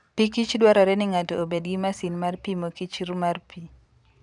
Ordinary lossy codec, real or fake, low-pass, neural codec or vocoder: none; fake; 10.8 kHz; vocoder, 44.1 kHz, 128 mel bands every 512 samples, BigVGAN v2